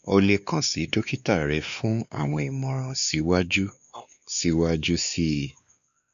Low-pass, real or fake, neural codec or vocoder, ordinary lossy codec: 7.2 kHz; fake; codec, 16 kHz, 2 kbps, X-Codec, WavLM features, trained on Multilingual LibriSpeech; none